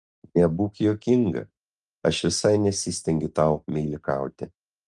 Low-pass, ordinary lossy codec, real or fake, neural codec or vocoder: 10.8 kHz; Opus, 32 kbps; real; none